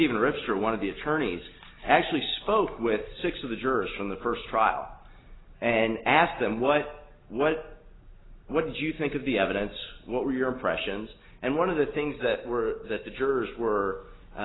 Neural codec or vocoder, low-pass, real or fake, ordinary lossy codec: none; 7.2 kHz; real; AAC, 16 kbps